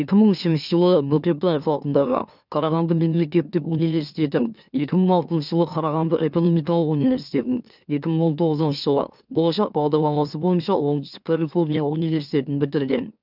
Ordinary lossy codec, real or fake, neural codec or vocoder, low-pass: none; fake; autoencoder, 44.1 kHz, a latent of 192 numbers a frame, MeloTTS; 5.4 kHz